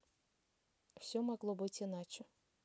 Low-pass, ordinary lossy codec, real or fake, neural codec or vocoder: none; none; real; none